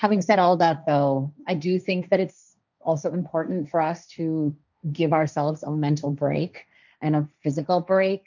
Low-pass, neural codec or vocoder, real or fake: 7.2 kHz; codec, 16 kHz, 1.1 kbps, Voila-Tokenizer; fake